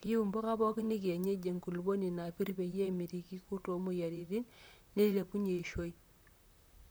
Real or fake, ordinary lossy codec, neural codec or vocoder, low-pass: fake; none; vocoder, 44.1 kHz, 128 mel bands, Pupu-Vocoder; none